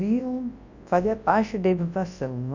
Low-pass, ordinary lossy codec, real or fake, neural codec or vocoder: 7.2 kHz; Opus, 64 kbps; fake; codec, 24 kHz, 0.9 kbps, WavTokenizer, large speech release